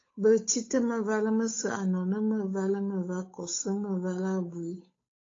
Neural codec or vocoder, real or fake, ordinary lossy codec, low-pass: codec, 16 kHz, 8 kbps, FunCodec, trained on Chinese and English, 25 frames a second; fake; AAC, 32 kbps; 7.2 kHz